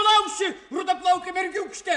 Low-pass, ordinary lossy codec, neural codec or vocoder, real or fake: 10.8 kHz; AAC, 64 kbps; none; real